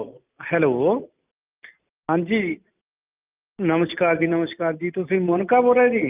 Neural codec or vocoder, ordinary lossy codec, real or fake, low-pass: none; Opus, 24 kbps; real; 3.6 kHz